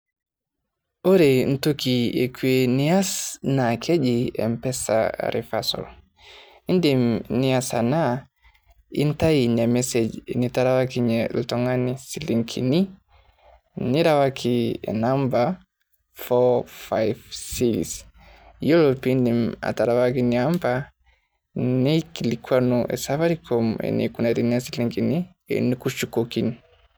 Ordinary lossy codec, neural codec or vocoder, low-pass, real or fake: none; none; none; real